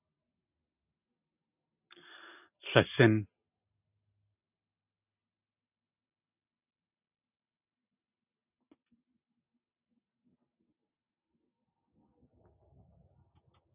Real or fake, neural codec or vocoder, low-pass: real; none; 3.6 kHz